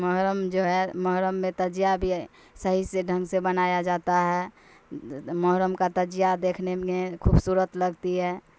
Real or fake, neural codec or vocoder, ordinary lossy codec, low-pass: real; none; none; none